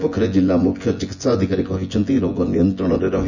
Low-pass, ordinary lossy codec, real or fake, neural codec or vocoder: 7.2 kHz; none; fake; vocoder, 24 kHz, 100 mel bands, Vocos